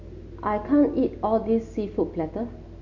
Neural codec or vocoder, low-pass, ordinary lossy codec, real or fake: none; 7.2 kHz; none; real